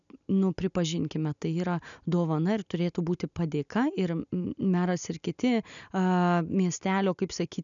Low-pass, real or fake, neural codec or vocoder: 7.2 kHz; real; none